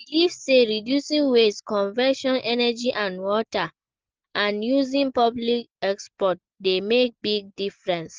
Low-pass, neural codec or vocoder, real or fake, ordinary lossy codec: 7.2 kHz; none; real; Opus, 16 kbps